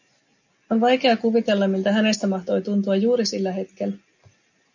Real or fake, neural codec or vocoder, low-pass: real; none; 7.2 kHz